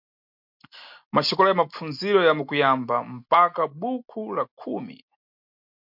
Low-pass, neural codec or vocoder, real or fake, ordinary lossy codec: 5.4 kHz; none; real; MP3, 48 kbps